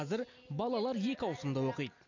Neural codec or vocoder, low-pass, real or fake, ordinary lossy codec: none; 7.2 kHz; real; none